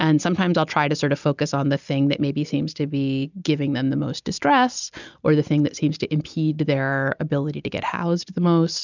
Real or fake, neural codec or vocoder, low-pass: real; none; 7.2 kHz